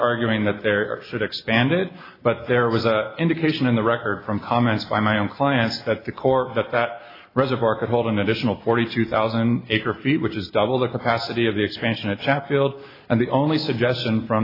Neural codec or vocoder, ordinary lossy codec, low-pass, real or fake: none; AAC, 24 kbps; 5.4 kHz; real